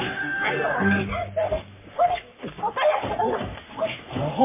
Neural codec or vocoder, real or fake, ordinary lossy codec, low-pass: codec, 44.1 kHz, 3.4 kbps, Pupu-Codec; fake; MP3, 24 kbps; 3.6 kHz